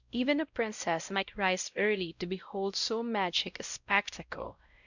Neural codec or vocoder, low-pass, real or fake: codec, 16 kHz, 0.5 kbps, X-Codec, WavLM features, trained on Multilingual LibriSpeech; 7.2 kHz; fake